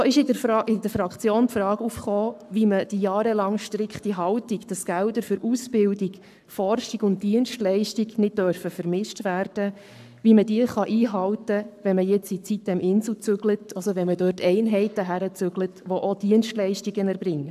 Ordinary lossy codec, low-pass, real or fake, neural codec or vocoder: AAC, 96 kbps; 14.4 kHz; fake; codec, 44.1 kHz, 7.8 kbps, Pupu-Codec